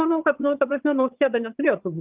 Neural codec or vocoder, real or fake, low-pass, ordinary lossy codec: vocoder, 22.05 kHz, 80 mel bands, HiFi-GAN; fake; 3.6 kHz; Opus, 32 kbps